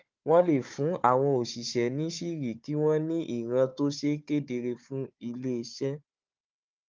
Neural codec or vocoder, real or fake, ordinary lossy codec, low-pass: codec, 16 kHz, 4 kbps, FunCodec, trained on Chinese and English, 50 frames a second; fake; Opus, 24 kbps; 7.2 kHz